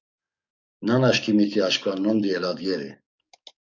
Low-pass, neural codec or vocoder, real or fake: 7.2 kHz; codec, 44.1 kHz, 7.8 kbps, DAC; fake